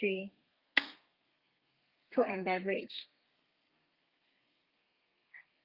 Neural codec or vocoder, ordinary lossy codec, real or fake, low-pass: codec, 32 kHz, 1.9 kbps, SNAC; Opus, 24 kbps; fake; 5.4 kHz